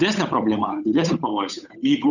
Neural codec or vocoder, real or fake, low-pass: codec, 16 kHz, 8 kbps, FunCodec, trained on Chinese and English, 25 frames a second; fake; 7.2 kHz